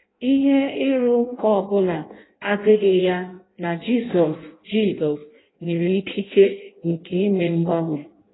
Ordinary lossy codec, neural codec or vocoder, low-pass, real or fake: AAC, 16 kbps; codec, 16 kHz in and 24 kHz out, 0.6 kbps, FireRedTTS-2 codec; 7.2 kHz; fake